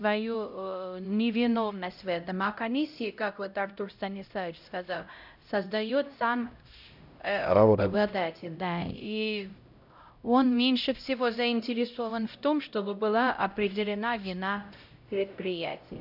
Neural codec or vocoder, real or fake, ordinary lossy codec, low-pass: codec, 16 kHz, 0.5 kbps, X-Codec, HuBERT features, trained on LibriSpeech; fake; none; 5.4 kHz